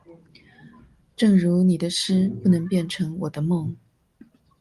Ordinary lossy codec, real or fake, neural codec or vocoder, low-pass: Opus, 32 kbps; real; none; 14.4 kHz